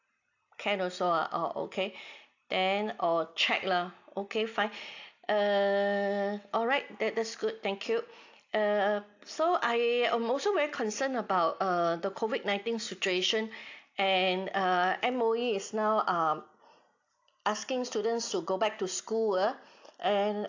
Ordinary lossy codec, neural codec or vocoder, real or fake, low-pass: AAC, 48 kbps; none; real; 7.2 kHz